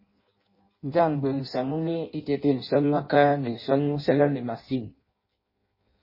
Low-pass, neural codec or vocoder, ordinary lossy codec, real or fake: 5.4 kHz; codec, 16 kHz in and 24 kHz out, 0.6 kbps, FireRedTTS-2 codec; MP3, 24 kbps; fake